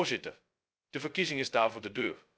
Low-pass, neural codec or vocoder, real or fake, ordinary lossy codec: none; codec, 16 kHz, 0.2 kbps, FocalCodec; fake; none